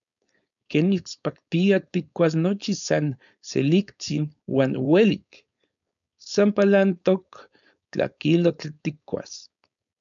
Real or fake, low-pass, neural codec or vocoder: fake; 7.2 kHz; codec, 16 kHz, 4.8 kbps, FACodec